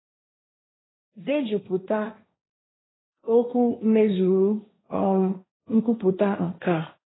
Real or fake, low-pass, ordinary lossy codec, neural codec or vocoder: fake; 7.2 kHz; AAC, 16 kbps; codec, 16 kHz, 1.1 kbps, Voila-Tokenizer